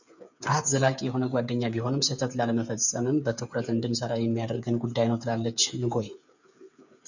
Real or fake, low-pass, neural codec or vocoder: fake; 7.2 kHz; codec, 16 kHz, 8 kbps, FreqCodec, smaller model